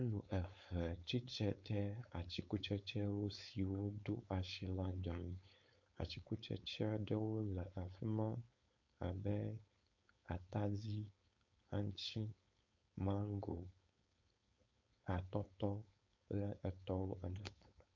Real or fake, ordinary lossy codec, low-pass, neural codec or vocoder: fake; MP3, 48 kbps; 7.2 kHz; codec, 16 kHz, 4.8 kbps, FACodec